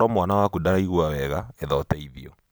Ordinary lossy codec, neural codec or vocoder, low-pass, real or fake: none; none; none; real